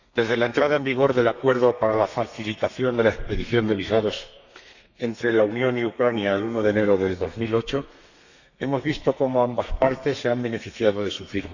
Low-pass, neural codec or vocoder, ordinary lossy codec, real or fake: 7.2 kHz; codec, 32 kHz, 1.9 kbps, SNAC; none; fake